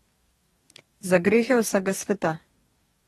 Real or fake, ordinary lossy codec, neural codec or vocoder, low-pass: fake; AAC, 32 kbps; codec, 44.1 kHz, 2.6 kbps, DAC; 19.8 kHz